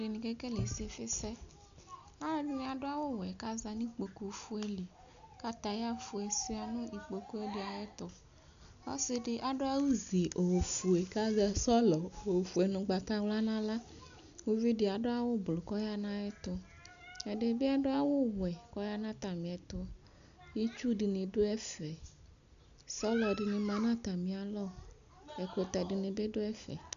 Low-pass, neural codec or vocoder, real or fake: 7.2 kHz; none; real